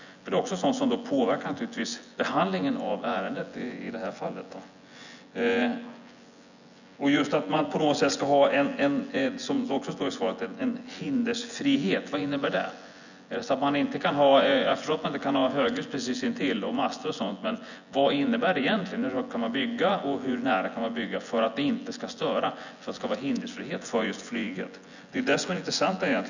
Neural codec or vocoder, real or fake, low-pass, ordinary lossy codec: vocoder, 24 kHz, 100 mel bands, Vocos; fake; 7.2 kHz; none